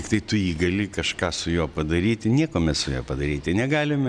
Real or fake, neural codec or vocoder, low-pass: real; none; 9.9 kHz